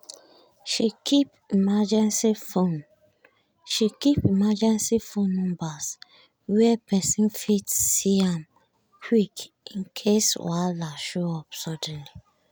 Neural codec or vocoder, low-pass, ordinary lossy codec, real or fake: none; none; none; real